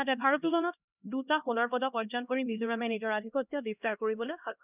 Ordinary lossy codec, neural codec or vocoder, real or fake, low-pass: none; codec, 16 kHz, 1 kbps, X-Codec, HuBERT features, trained on LibriSpeech; fake; 3.6 kHz